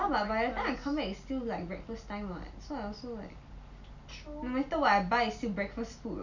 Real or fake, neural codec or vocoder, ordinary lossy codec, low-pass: real; none; none; 7.2 kHz